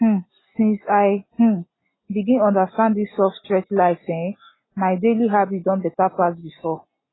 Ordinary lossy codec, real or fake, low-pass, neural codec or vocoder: AAC, 16 kbps; real; 7.2 kHz; none